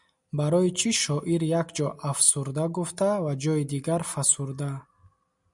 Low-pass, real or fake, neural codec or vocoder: 10.8 kHz; real; none